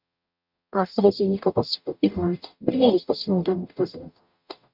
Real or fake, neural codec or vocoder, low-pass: fake; codec, 44.1 kHz, 0.9 kbps, DAC; 5.4 kHz